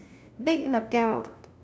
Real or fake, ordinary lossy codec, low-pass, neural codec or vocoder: fake; none; none; codec, 16 kHz, 0.5 kbps, FunCodec, trained on LibriTTS, 25 frames a second